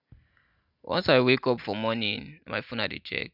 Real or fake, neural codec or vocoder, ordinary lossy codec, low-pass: real; none; none; 5.4 kHz